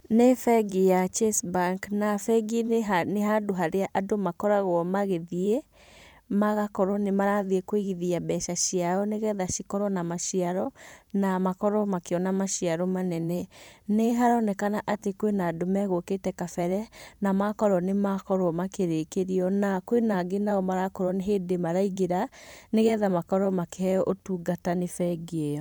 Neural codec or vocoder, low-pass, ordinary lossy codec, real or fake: vocoder, 44.1 kHz, 128 mel bands every 512 samples, BigVGAN v2; none; none; fake